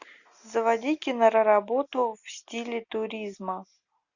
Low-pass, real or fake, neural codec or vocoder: 7.2 kHz; real; none